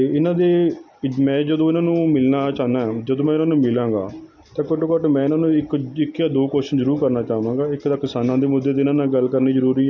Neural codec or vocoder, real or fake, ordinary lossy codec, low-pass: none; real; none; 7.2 kHz